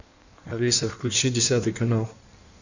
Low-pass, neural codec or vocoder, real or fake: 7.2 kHz; codec, 16 kHz in and 24 kHz out, 1.1 kbps, FireRedTTS-2 codec; fake